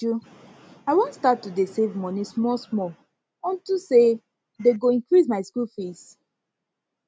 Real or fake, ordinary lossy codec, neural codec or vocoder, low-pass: real; none; none; none